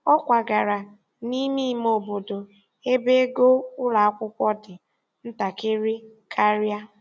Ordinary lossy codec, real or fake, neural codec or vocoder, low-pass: none; real; none; none